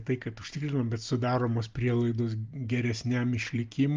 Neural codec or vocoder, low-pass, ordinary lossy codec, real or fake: none; 7.2 kHz; Opus, 32 kbps; real